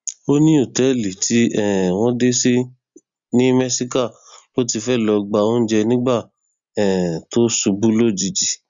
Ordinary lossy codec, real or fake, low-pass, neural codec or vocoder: Opus, 64 kbps; real; 7.2 kHz; none